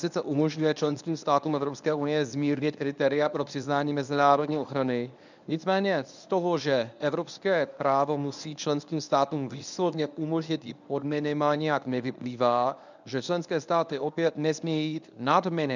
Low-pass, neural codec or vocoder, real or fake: 7.2 kHz; codec, 24 kHz, 0.9 kbps, WavTokenizer, medium speech release version 1; fake